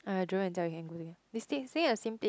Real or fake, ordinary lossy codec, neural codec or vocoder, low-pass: real; none; none; none